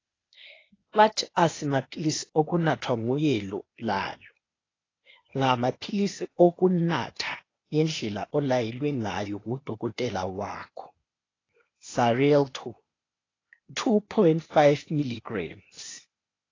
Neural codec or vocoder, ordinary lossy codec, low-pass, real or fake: codec, 16 kHz, 0.8 kbps, ZipCodec; AAC, 32 kbps; 7.2 kHz; fake